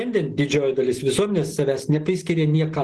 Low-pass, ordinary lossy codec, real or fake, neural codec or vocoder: 10.8 kHz; Opus, 16 kbps; real; none